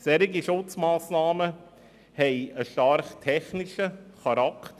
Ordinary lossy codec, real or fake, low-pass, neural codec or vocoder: none; real; 14.4 kHz; none